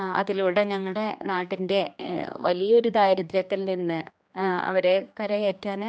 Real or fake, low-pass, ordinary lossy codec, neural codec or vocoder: fake; none; none; codec, 16 kHz, 2 kbps, X-Codec, HuBERT features, trained on general audio